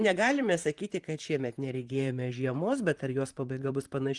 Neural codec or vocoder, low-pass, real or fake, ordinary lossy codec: vocoder, 44.1 kHz, 128 mel bands, Pupu-Vocoder; 10.8 kHz; fake; Opus, 24 kbps